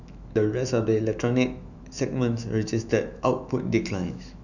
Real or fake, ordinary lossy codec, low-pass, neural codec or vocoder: fake; none; 7.2 kHz; autoencoder, 48 kHz, 128 numbers a frame, DAC-VAE, trained on Japanese speech